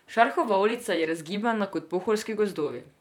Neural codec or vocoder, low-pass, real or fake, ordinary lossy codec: vocoder, 44.1 kHz, 128 mel bands, Pupu-Vocoder; 19.8 kHz; fake; none